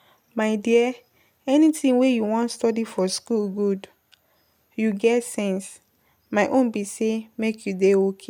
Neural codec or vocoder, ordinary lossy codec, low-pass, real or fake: none; MP3, 96 kbps; 19.8 kHz; real